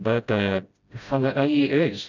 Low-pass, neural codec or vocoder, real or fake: 7.2 kHz; codec, 16 kHz, 0.5 kbps, FreqCodec, smaller model; fake